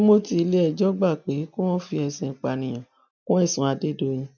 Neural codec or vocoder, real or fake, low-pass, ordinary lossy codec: none; real; 7.2 kHz; none